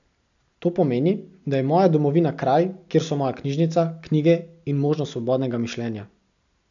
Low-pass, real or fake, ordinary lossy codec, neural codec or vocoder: 7.2 kHz; real; none; none